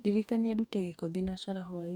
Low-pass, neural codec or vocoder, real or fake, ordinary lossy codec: 19.8 kHz; codec, 44.1 kHz, 2.6 kbps, DAC; fake; none